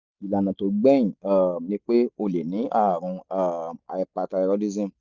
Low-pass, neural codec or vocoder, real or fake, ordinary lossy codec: 7.2 kHz; none; real; Opus, 64 kbps